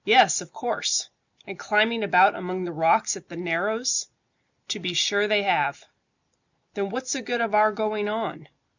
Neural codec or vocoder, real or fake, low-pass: none; real; 7.2 kHz